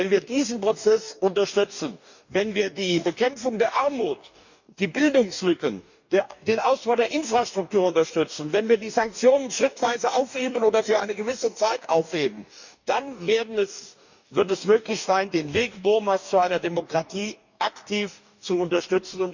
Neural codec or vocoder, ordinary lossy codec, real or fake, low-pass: codec, 44.1 kHz, 2.6 kbps, DAC; none; fake; 7.2 kHz